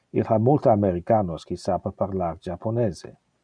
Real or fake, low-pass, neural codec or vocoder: real; 9.9 kHz; none